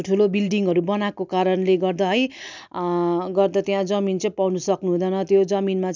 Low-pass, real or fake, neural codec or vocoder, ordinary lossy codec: 7.2 kHz; real; none; none